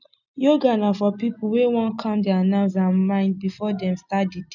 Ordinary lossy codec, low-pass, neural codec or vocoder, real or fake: none; 7.2 kHz; none; real